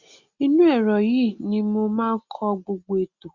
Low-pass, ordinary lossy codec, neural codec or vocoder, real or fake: 7.2 kHz; Opus, 64 kbps; none; real